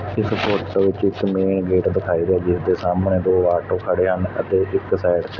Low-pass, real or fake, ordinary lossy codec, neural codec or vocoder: 7.2 kHz; real; none; none